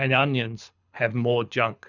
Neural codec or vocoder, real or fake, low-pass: codec, 24 kHz, 6 kbps, HILCodec; fake; 7.2 kHz